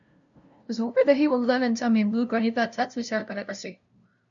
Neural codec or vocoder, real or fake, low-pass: codec, 16 kHz, 0.5 kbps, FunCodec, trained on LibriTTS, 25 frames a second; fake; 7.2 kHz